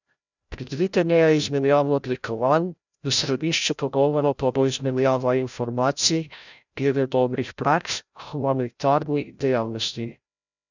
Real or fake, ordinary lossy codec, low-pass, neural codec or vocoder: fake; none; 7.2 kHz; codec, 16 kHz, 0.5 kbps, FreqCodec, larger model